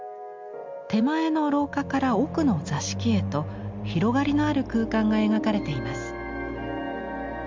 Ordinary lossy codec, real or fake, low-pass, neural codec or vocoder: MP3, 64 kbps; real; 7.2 kHz; none